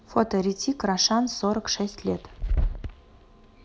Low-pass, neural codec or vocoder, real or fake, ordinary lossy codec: none; none; real; none